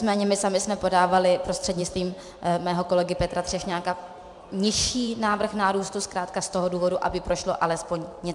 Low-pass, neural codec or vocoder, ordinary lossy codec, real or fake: 10.8 kHz; vocoder, 44.1 kHz, 128 mel bands every 512 samples, BigVGAN v2; MP3, 96 kbps; fake